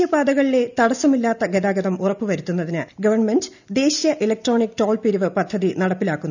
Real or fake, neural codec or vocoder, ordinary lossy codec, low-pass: real; none; none; 7.2 kHz